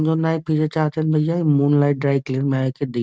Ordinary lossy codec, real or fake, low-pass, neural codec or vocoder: none; real; none; none